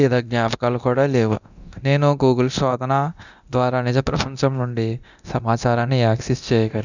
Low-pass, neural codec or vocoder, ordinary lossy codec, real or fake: 7.2 kHz; codec, 24 kHz, 0.9 kbps, DualCodec; none; fake